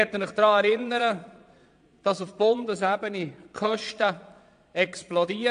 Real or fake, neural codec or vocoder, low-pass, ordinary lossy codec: fake; vocoder, 22.05 kHz, 80 mel bands, Vocos; 9.9 kHz; AAC, 64 kbps